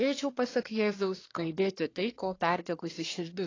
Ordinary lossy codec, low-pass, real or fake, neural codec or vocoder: AAC, 32 kbps; 7.2 kHz; fake; codec, 24 kHz, 1 kbps, SNAC